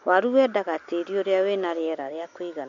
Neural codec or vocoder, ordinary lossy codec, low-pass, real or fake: none; MP3, 48 kbps; 7.2 kHz; real